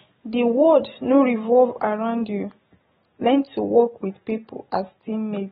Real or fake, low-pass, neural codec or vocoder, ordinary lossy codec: real; 19.8 kHz; none; AAC, 16 kbps